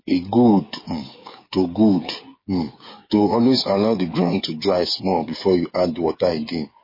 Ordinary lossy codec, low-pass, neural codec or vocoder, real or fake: MP3, 24 kbps; 5.4 kHz; codec, 16 kHz, 8 kbps, FreqCodec, smaller model; fake